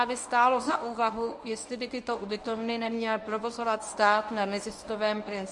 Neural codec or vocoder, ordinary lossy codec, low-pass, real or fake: codec, 24 kHz, 0.9 kbps, WavTokenizer, medium speech release version 1; MP3, 96 kbps; 10.8 kHz; fake